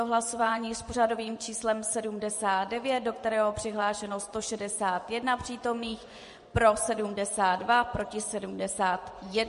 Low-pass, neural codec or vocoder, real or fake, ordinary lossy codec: 10.8 kHz; vocoder, 24 kHz, 100 mel bands, Vocos; fake; MP3, 48 kbps